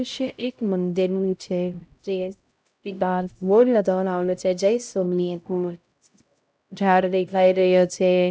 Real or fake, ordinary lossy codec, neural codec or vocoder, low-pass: fake; none; codec, 16 kHz, 0.5 kbps, X-Codec, HuBERT features, trained on LibriSpeech; none